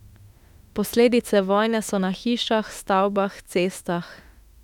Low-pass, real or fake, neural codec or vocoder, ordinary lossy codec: 19.8 kHz; fake; autoencoder, 48 kHz, 32 numbers a frame, DAC-VAE, trained on Japanese speech; none